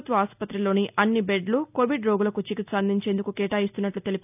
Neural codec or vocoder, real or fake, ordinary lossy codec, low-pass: none; real; none; 3.6 kHz